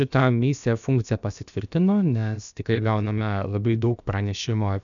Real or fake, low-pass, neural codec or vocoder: fake; 7.2 kHz; codec, 16 kHz, about 1 kbps, DyCAST, with the encoder's durations